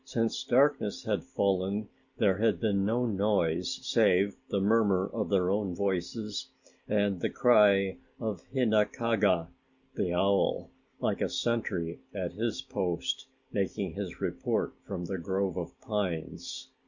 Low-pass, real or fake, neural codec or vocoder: 7.2 kHz; real; none